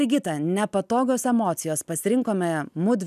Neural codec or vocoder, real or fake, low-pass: none; real; 14.4 kHz